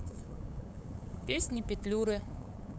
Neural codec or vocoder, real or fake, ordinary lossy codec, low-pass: codec, 16 kHz, 8 kbps, FunCodec, trained on LibriTTS, 25 frames a second; fake; none; none